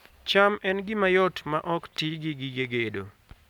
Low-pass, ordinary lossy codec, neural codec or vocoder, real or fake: 19.8 kHz; none; none; real